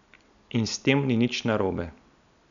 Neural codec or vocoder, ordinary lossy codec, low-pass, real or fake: none; none; 7.2 kHz; real